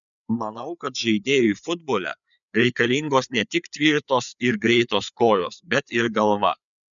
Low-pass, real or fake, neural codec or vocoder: 7.2 kHz; fake; codec, 16 kHz, 4 kbps, FreqCodec, larger model